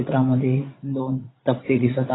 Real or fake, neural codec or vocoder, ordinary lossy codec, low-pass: fake; vocoder, 44.1 kHz, 80 mel bands, Vocos; AAC, 16 kbps; 7.2 kHz